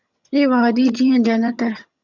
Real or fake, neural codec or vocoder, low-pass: fake; vocoder, 22.05 kHz, 80 mel bands, HiFi-GAN; 7.2 kHz